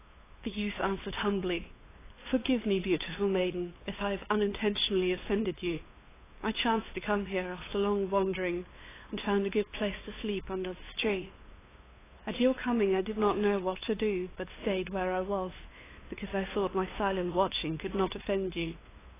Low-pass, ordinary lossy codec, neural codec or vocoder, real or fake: 3.6 kHz; AAC, 16 kbps; codec, 16 kHz, 2 kbps, FunCodec, trained on LibriTTS, 25 frames a second; fake